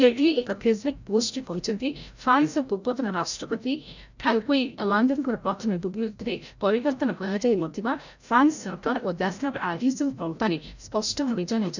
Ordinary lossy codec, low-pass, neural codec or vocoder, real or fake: none; 7.2 kHz; codec, 16 kHz, 0.5 kbps, FreqCodec, larger model; fake